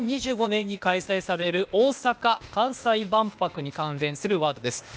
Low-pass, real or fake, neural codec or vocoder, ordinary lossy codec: none; fake; codec, 16 kHz, 0.8 kbps, ZipCodec; none